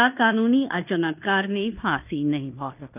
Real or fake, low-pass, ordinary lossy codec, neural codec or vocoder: fake; 3.6 kHz; none; codec, 16 kHz in and 24 kHz out, 0.9 kbps, LongCat-Audio-Codec, fine tuned four codebook decoder